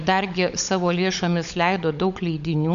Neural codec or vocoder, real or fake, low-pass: codec, 16 kHz, 16 kbps, FunCodec, trained on LibriTTS, 50 frames a second; fake; 7.2 kHz